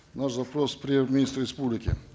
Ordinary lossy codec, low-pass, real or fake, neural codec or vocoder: none; none; real; none